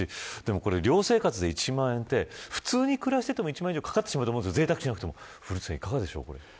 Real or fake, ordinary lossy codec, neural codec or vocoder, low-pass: real; none; none; none